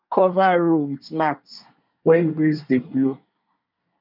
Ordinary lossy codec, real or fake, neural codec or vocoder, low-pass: none; fake; codec, 24 kHz, 1 kbps, SNAC; 5.4 kHz